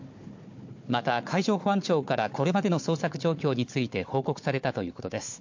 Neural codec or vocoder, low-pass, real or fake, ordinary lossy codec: codec, 16 kHz, 4 kbps, FunCodec, trained on Chinese and English, 50 frames a second; 7.2 kHz; fake; MP3, 48 kbps